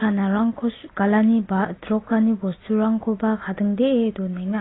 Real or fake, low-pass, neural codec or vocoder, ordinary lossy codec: real; 7.2 kHz; none; AAC, 16 kbps